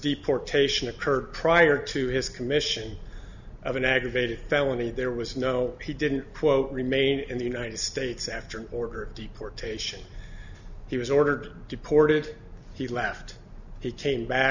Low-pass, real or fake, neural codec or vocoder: 7.2 kHz; real; none